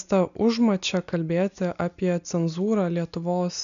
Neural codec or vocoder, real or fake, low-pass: none; real; 7.2 kHz